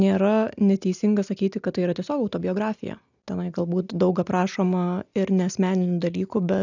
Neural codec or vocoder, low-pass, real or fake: none; 7.2 kHz; real